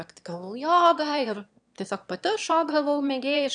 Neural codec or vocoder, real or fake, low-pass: autoencoder, 22.05 kHz, a latent of 192 numbers a frame, VITS, trained on one speaker; fake; 9.9 kHz